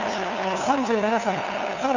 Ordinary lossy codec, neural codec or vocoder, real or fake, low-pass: none; codec, 16 kHz, 2 kbps, FunCodec, trained on LibriTTS, 25 frames a second; fake; 7.2 kHz